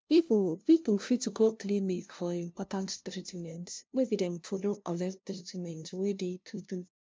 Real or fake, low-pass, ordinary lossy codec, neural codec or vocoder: fake; none; none; codec, 16 kHz, 0.5 kbps, FunCodec, trained on LibriTTS, 25 frames a second